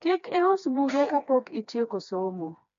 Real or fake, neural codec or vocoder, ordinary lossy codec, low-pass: fake; codec, 16 kHz, 2 kbps, FreqCodec, smaller model; MP3, 64 kbps; 7.2 kHz